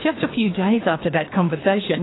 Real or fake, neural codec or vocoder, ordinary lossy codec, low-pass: fake; codec, 24 kHz, 3 kbps, HILCodec; AAC, 16 kbps; 7.2 kHz